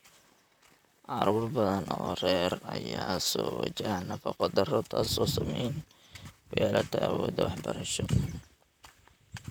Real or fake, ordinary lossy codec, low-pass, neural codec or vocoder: fake; none; none; vocoder, 44.1 kHz, 128 mel bands, Pupu-Vocoder